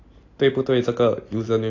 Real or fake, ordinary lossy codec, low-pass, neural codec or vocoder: real; AAC, 32 kbps; 7.2 kHz; none